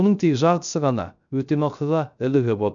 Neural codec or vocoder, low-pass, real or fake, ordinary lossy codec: codec, 16 kHz, 0.3 kbps, FocalCodec; 7.2 kHz; fake; none